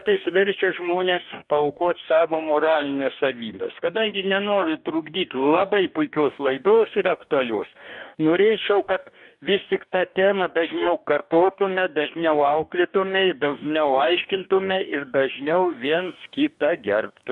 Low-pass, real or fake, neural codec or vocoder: 10.8 kHz; fake; codec, 44.1 kHz, 2.6 kbps, DAC